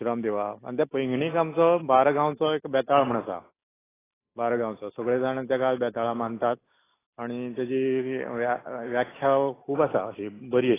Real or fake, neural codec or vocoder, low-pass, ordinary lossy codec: real; none; 3.6 kHz; AAC, 16 kbps